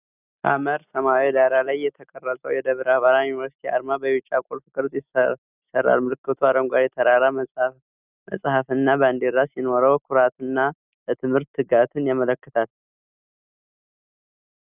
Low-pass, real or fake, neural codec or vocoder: 3.6 kHz; real; none